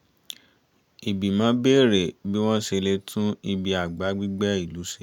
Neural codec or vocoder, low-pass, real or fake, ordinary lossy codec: none; 19.8 kHz; real; none